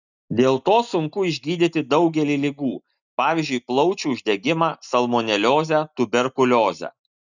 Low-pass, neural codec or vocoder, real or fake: 7.2 kHz; none; real